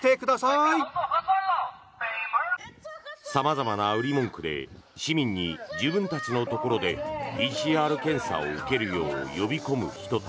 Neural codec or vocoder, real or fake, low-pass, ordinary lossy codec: none; real; none; none